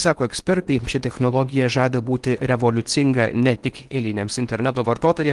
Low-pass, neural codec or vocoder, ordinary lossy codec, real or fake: 10.8 kHz; codec, 16 kHz in and 24 kHz out, 0.8 kbps, FocalCodec, streaming, 65536 codes; Opus, 24 kbps; fake